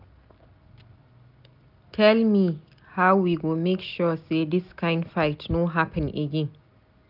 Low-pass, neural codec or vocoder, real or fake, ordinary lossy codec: 5.4 kHz; none; real; none